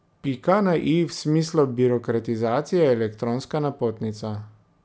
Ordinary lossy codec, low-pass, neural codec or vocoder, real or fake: none; none; none; real